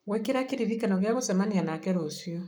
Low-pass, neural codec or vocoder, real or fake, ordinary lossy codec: none; codec, 44.1 kHz, 7.8 kbps, Pupu-Codec; fake; none